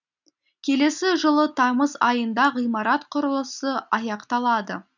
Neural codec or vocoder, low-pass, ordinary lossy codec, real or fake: none; 7.2 kHz; none; real